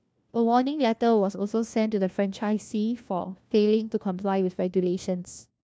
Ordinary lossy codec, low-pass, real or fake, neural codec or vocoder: none; none; fake; codec, 16 kHz, 1 kbps, FunCodec, trained on LibriTTS, 50 frames a second